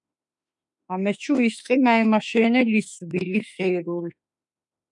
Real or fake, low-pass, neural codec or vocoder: fake; 10.8 kHz; autoencoder, 48 kHz, 32 numbers a frame, DAC-VAE, trained on Japanese speech